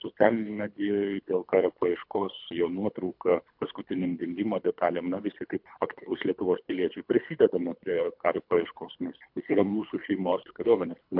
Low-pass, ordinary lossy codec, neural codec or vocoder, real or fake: 5.4 kHz; MP3, 48 kbps; codec, 24 kHz, 3 kbps, HILCodec; fake